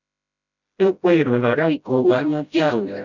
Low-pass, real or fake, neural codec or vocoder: 7.2 kHz; fake; codec, 16 kHz, 0.5 kbps, FreqCodec, smaller model